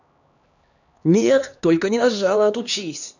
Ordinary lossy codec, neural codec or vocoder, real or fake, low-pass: none; codec, 16 kHz, 2 kbps, X-Codec, HuBERT features, trained on LibriSpeech; fake; 7.2 kHz